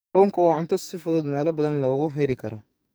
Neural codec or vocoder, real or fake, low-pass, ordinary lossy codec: codec, 44.1 kHz, 2.6 kbps, SNAC; fake; none; none